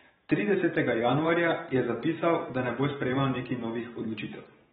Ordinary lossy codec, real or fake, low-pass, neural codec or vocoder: AAC, 16 kbps; fake; 19.8 kHz; vocoder, 44.1 kHz, 128 mel bands every 512 samples, BigVGAN v2